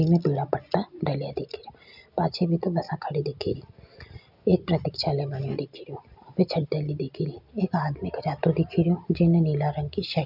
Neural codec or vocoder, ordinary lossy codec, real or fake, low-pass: none; none; real; 5.4 kHz